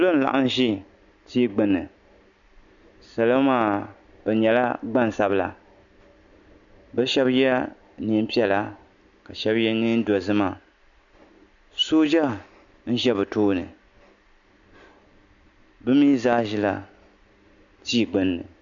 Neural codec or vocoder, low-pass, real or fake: none; 7.2 kHz; real